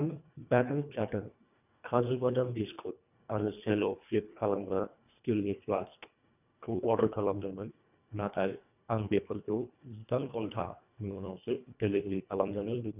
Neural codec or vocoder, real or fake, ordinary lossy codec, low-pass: codec, 24 kHz, 1.5 kbps, HILCodec; fake; none; 3.6 kHz